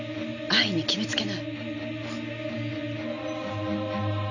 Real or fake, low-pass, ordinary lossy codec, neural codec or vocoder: real; 7.2 kHz; none; none